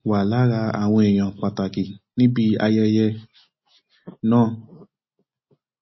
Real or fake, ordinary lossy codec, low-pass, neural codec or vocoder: real; MP3, 24 kbps; 7.2 kHz; none